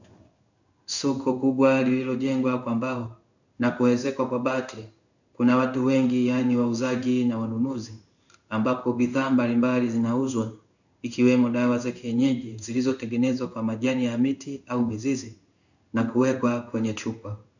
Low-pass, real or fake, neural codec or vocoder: 7.2 kHz; fake; codec, 16 kHz in and 24 kHz out, 1 kbps, XY-Tokenizer